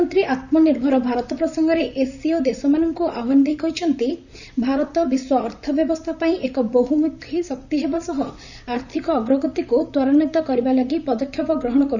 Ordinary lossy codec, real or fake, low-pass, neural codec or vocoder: none; fake; 7.2 kHz; vocoder, 44.1 kHz, 128 mel bands, Pupu-Vocoder